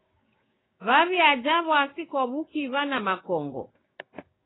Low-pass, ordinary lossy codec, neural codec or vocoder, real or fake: 7.2 kHz; AAC, 16 kbps; codec, 16 kHz, 6 kbps, DAC; fake